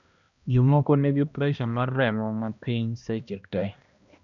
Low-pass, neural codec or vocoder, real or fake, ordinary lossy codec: 7.2 kHz; codec, 16 kHz, 1 kbps, X-Codec, HuBERT features, trained on balanced general audio; fake; none